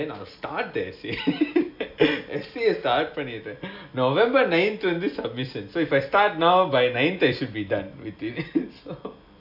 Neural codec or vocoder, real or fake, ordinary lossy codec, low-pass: none; real; AAC, 48 kbps; 5.4 kHz